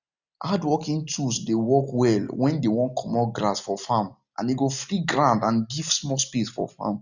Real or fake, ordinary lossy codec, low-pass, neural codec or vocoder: real; none; 7.2 kHz; none